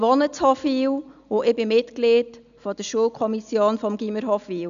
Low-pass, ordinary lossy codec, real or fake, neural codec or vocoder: 7.2 kHz; none; real; none